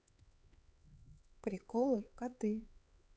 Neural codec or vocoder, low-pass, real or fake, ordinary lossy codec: codec, 16 kHz, 4 kbps, X-Codec, HuBERT features, trained on LibriSpeech; none; fake; none